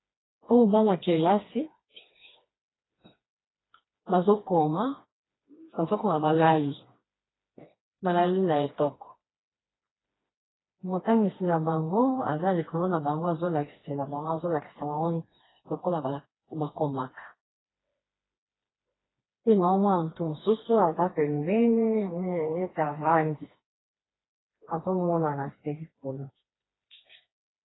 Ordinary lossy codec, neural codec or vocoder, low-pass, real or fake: AAC, 16 kbps; codec, 16 kHz, 2 kbps, FreqCodec, smaller model; 7.2 kHz; fake